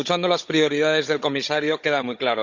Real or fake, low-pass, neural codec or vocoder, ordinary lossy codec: fake; 7.2 kHz; codec, 16 kHz, 16 kbps, FunCodec, trained on Chinese and English, 50 frames a second; Opus, 64 kbps